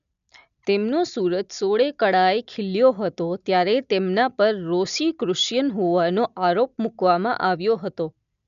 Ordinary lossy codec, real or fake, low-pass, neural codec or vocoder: none; real; 7.2 kHz; none